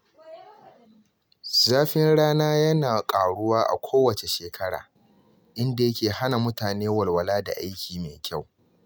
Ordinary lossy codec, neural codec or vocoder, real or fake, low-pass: none; none; real; none